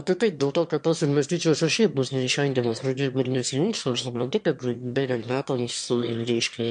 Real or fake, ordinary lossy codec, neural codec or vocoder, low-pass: fake; MP3, 64 kbps; autoencoder, 22.05 kHz, a latent of 192 numbers a frame, VITS, trained on one speaker; 9.9 kHz